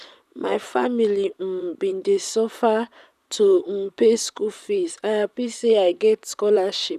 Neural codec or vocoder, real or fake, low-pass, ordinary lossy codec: vocoder, 44.1 kHz, 128 mel bands, Pupu-Vocoder; fake; 14.4 kHz; none